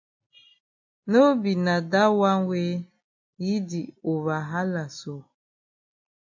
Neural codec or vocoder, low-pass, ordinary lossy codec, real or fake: none; 7.2 kHz; MP3, 48 kbps; real